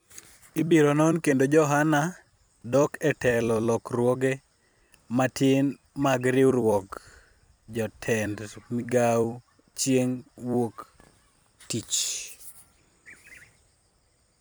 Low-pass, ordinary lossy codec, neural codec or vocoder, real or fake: none; none; vocoder, 44.1 kHz, 128 mel bands every 256 samples, BigVGAN v2; fake